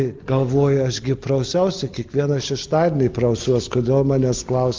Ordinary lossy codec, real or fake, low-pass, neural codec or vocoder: Opus, 32 kbps; real; 7.2 kHz; none